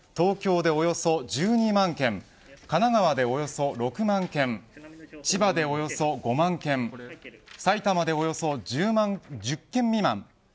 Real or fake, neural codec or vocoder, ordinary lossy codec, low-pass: real; none; none; none